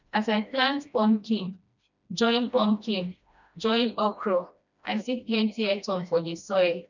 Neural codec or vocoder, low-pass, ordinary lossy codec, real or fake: codec, 16 kHz, 1 kbps, FreqCodec, smaller model; 7.2 kHz; none; fake